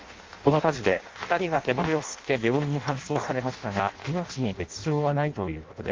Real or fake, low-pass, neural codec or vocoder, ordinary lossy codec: fake; 7.2 kHz; codec, 16 kHz in and 24 kHz out, 0.6 kbps, FireRedTTS-2 codec; Opus, 32 kbps